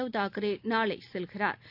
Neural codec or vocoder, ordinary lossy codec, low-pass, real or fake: none; AAC, 48 kbps; 5.4 kHz; real